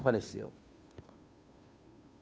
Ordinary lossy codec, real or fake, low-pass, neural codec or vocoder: none; fake; none; codec, 16 kHz, 2 kbps, FunCodec, trained on Chinese and English, 25 frames a second